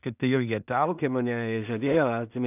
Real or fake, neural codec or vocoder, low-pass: fake; codec, 16 kHz in and 24 kHz out, 0.4 kbps, LongCat-Audio-Codec, two codebook decoder; 3.6 kHz